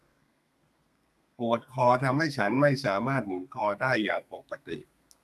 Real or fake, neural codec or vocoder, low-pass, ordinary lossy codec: fake; codec, 32 kHz, 1.9 kbps, SNAC; 14.4 kHz; none